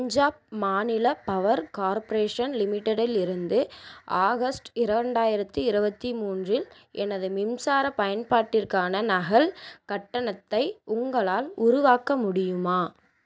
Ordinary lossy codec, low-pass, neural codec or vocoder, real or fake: none; none; none; real